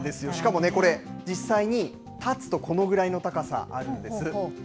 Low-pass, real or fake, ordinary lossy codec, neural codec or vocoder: none; real; none; none